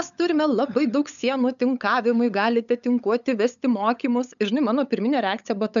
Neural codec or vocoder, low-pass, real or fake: codec, 16 kHz, 8 kbps, FunCodec, trained on LibriTTS, 25 frames a second; 7.2 kHz; fake